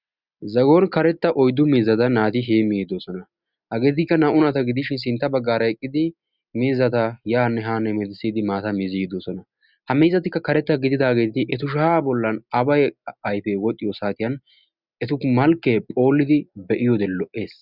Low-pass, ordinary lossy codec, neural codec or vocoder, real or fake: 5.4 kHz; Opus, 64 kbps; none; real